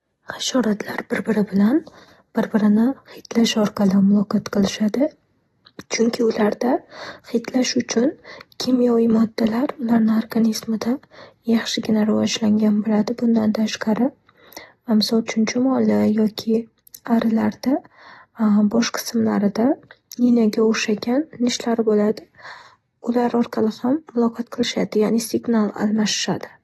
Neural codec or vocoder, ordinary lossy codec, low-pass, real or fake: none; AAC, 32 kbps; 19.8 kHz; real